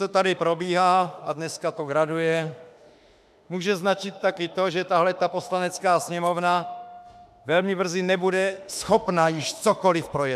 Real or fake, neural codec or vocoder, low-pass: fake; autoencoder, 48 kHz, 32 numbers a frame, DAC-VAE, trained on Japanese speech; 14.4 kHz